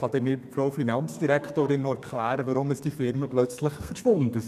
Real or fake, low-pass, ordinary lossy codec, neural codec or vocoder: fake; 14.4 kHz; none; codec, 32 kHz, 1.9 kbps, SNAC